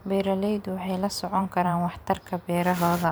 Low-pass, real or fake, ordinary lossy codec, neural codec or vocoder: none; fake; none; vocoder, 44.1 kHz, 128 mel bands every 512 samples, BigVGAN v2